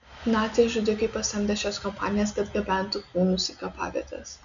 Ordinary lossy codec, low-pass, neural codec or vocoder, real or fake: MP3, 96 kbps; 7.2 kHz; none; real